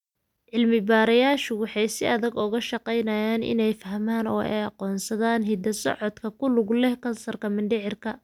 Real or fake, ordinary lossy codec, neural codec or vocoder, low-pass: real; none; none; 19.8 kHz